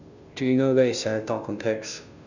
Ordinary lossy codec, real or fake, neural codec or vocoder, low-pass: none; fake; codec, 16 kHz, 0.5 kbps, FunCodec, trained on Chinese and English, 25 frames a second; 7.2 kHz